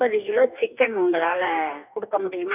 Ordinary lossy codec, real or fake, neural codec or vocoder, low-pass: none; fake; codec, 44.1 kHz, 2.6 kbps, DAC; 3.6 kHz